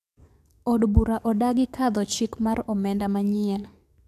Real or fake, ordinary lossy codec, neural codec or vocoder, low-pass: fake; none; codec, 44.1 kHz, 7.8 kbps, DAC; 14.4 kHz